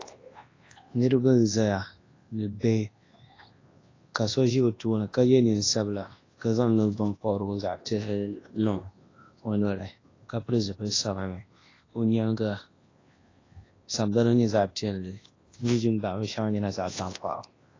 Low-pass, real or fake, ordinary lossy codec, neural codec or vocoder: 7.2 kHz; fake; AAC, 32 kbps; codec, 24 kHz, 0.9 kbps, WavTokenizer, large speech release